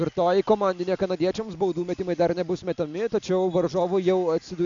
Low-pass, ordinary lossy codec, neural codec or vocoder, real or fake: 7.2 kHz; MP3, 64 kbps; none; real